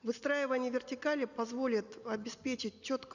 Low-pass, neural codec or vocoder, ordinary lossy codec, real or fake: 7.2 kHz; none; none; real